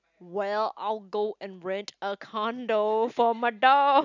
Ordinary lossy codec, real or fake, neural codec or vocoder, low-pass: none; real; none; 7.2 kHz